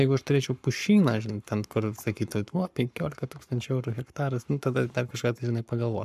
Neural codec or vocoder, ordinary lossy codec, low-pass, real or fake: codec, 44.1 kHz, 7.8 kbps, Pupu-Codec; AAC, 96 kbps; 14.4 kHz; fake